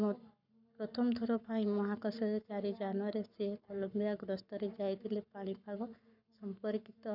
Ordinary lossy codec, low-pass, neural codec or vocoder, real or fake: none; 5.4 kHz; codec, 44.1 kHz, 7.8 kbps, Pupu-Codec; fake